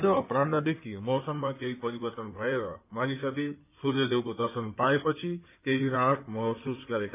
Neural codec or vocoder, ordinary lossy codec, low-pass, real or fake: codec, 16 kHz in and 24 kHz out, 2.2 kbps, FireRedTTS-2 codec; none; 3.6 kHz; fake